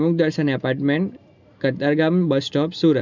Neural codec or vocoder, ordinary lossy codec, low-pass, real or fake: none; none; 7.2 kHz; real